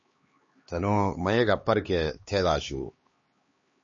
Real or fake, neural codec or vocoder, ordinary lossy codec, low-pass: fake; codec, 16 kHz, 2 kbps, X-Codec, HuBERT features, trained on LibriSpeech; MP3, 32 kbps; 7.2 kHz